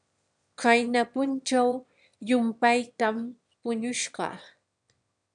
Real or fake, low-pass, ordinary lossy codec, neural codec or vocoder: fake; 9.9 kHz; MP3, 96 kbps; autoencoder, 22.05 kHz, a latent of 192 numbers a frame, VITS, trained on one speaker